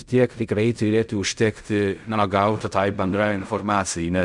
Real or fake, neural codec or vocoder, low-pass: fake; codec, 16 kHz in and 24 kHz out, 0.4 kbps, LongCat-Audio-Codec, fine tuned four codebook decoder; 10.8 kHz